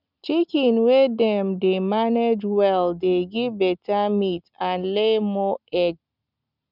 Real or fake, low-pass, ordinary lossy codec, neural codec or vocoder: real; 5.4 kHz; none; none